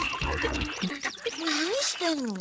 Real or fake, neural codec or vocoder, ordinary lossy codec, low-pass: fake; codec, 16 kHz, 8 kbps, FreqCodec, larger model; none; none